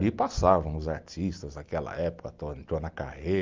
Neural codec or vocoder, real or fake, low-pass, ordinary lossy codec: none; real; 7.2 kHz; Opus, 24 kbps